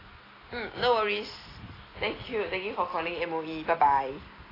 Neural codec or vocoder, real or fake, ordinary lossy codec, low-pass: none; real; AAC, 24 kbps; 5.4 kHz